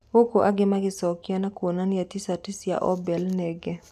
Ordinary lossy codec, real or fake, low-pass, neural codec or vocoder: none; real; 14.4 kHz; none